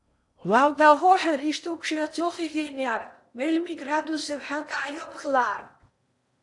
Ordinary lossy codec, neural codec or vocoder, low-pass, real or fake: AAC, 64 kbps; codec, 16 kHz in and 24 kHz out, 0.8 kbps, FocalCodec, streaming, 65536 codes; 10.8 kHz; fake